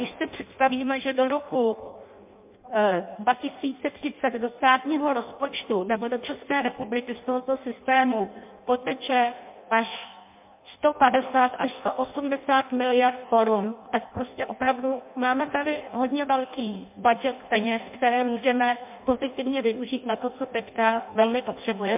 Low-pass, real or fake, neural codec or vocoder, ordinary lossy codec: 3.6 kHz; fake; codec, 16 kHz in and 24 kHz out, 0.6 kbps, FireRedTTS-2 codec; MP3, 32 kbps